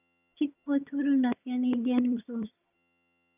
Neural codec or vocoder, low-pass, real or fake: vocoder, 22.05 kHz, 80 mel bands, HiFi-GAN; 3.6 kHz; fake